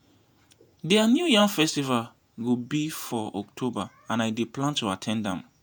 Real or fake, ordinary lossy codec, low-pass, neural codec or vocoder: fake; none; none; vocoder, 48 kHz, 128 mel bands, Vocos